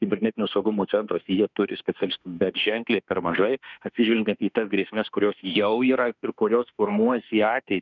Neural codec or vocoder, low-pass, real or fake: autoencoder, 48 kHz, 32 numbers a frame, DAC-VAE, trained on Japanese speech; 7.2 kHz; fake